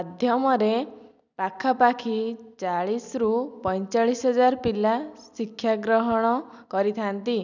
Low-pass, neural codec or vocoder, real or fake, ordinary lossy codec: 7.2 kHz; none; real; none